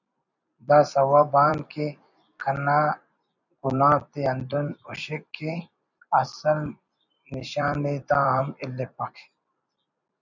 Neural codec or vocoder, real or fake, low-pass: vocoder, 44.1 kHz, 128 mel bands every 256 samples, BigVGAN v2; fake; 7.2 kHz